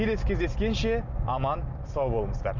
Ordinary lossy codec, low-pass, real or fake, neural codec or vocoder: none; 7.2 kHz; real; none